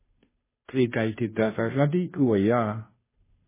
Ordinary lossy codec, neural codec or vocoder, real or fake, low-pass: MP3, 16 kbps; codec, 16 kHz, 0.5 kbps, FunCodec, trained on Chinese and English, 25 frames a second; fake; 3.6 kHz